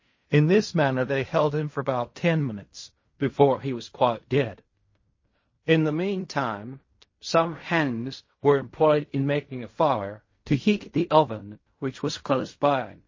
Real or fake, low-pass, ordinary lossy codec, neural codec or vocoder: fake; 7.2 kHz; MP3, 32 kbps; codec, 16 kHz in and 24 kHz out, 0.4 kbps, LongCat-Audio-Codec, fine tuned four codebook decoder